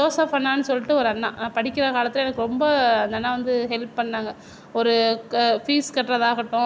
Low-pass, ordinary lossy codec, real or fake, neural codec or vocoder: none; none; real; none